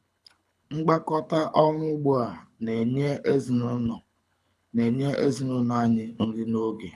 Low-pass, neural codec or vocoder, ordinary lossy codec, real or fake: none; codec, 24 kHz, 6 kbps, HILCodec; none; fake